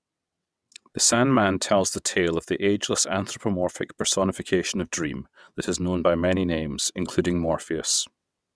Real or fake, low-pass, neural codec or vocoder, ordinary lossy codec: fake; none; vocoder, 22.05 kHz, 80 mel bands, WaveNeXt; none